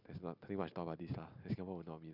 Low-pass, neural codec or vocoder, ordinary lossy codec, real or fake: 5.4 kHz; none; none; real